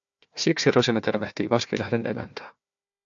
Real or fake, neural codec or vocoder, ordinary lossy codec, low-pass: fake; codec, 16 kHz, 1 kbps, FunCodec, trained on Chinese and English, 50 frames a second; AAC, 64 kbps; 7.2 kHz